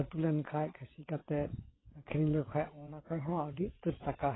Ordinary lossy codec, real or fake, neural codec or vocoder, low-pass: AAC, 16 kbps; fake; vocoder, 22.05 kHz, 80 mel bands, WaveNeXt; 7.2 kHz